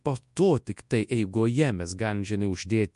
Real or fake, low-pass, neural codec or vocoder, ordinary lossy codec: fake; 10.8 kHz; codec, 24 kHz, 0.9 kbps, WavTokenizer, large speech release; MP3, 64 kbps